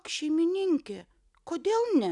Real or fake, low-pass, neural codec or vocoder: real; 10.8 kHz; none